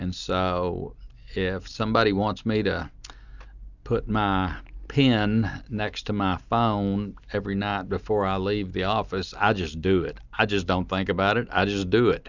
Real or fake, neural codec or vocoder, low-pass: real; none; 7.2 kHz